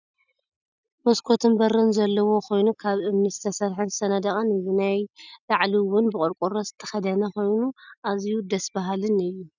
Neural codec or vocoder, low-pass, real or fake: none; 7.2 kHz; real